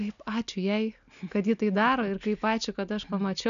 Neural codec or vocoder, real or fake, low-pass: none; real; 7.2 kHz